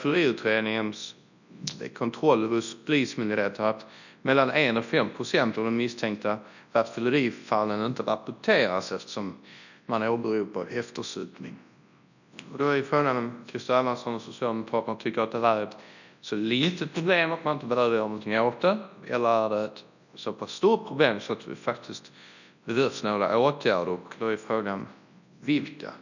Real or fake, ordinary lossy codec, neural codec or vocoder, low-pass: fake; none; codec, 24 kHz, 0.9 kbps, WavTokenizer, large speech release; 7.2 kHz